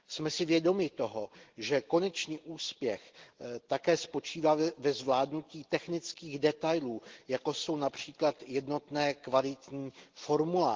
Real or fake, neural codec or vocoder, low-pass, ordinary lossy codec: real; none; 7.2 kHz; Opus, 16 kbps